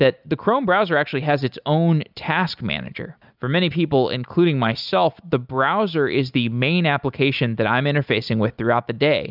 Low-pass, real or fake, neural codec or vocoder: 5.4 kHz; real; none